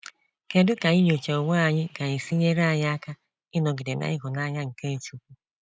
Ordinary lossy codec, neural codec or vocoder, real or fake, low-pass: none; none; real; none